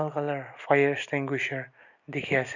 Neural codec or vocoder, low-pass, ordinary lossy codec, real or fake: none; 7.2 kHz; none; real